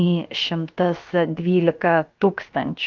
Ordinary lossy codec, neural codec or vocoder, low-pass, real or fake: Opus, 24 kbps; codec, 16 kHz, about 1 kbps, DyCAST, with the encoder's durations; 7.2 kHz; fake